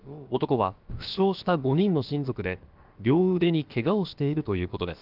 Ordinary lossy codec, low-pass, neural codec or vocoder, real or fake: Opus, 24 kbps; 5.4 kHz; codec, 16 kHz, about 1 kbps, DyCAST, with the encoder's durations; fake